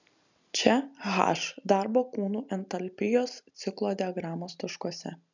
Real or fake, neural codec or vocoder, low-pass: real; none; 7.2 kHz